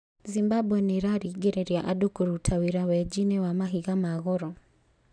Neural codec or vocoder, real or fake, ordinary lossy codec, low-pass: none; real; none; 9.9 kHz